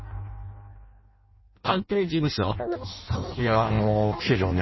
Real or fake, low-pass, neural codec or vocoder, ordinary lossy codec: fake; 7.2 kHz; codec, 16 kHz in and 24 kHz out, 0.6 kbps, FireRedTTS-2 codec; MP3, 24 kbps